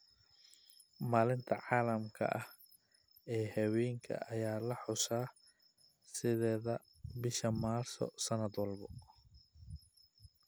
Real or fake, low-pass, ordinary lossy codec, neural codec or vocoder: real; none; none; none